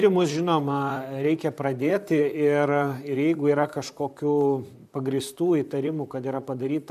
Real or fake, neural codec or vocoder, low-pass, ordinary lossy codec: fake; vocoder, 44.1 kHz, 128 mel bands, Pupu-Vocoder; 14.4 kHz; MP3, 96 kbps